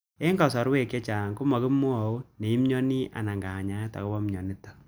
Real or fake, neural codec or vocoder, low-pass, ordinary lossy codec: real; none; none; none